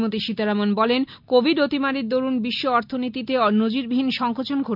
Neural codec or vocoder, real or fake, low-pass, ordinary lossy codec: none; real; 5.4 kHz; none